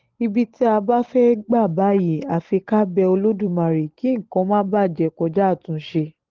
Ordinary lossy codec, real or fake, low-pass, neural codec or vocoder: Opus, 16 kbps; real; 7.2 kHz; none